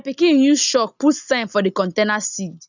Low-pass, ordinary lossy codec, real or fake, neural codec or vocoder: 7.2 kHz; none; real; none